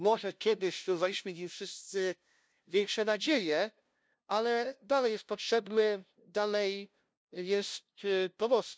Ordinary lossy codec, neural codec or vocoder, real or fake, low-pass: none; codec, 16 kHz, 0.5 kbps, FunCodec, trained on LibriTTS, 25 frames a second; fake; none